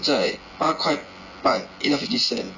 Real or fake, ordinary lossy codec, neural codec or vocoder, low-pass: fake; none; vocoder, 24 kHz, 100 mel bands, Vocos; 7.2 kHz